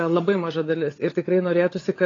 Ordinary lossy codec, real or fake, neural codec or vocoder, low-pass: AAC, 32 kbps; fake; codec, 16 kHz, 16 kbps, FunCodec, trained on LibriTTS, 50 frames a second; 7.2 kHz